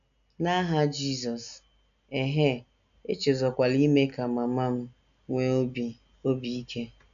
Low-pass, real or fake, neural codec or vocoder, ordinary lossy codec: 7.2 kHz; real; none; none